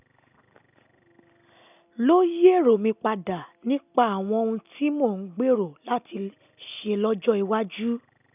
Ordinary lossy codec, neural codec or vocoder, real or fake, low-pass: none; none; real; 3.6 kHz